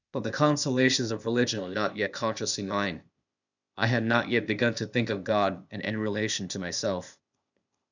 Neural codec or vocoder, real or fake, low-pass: codec, 16 kHz, 0.8 kbps, ZipCodec; fake; 7.2 kHz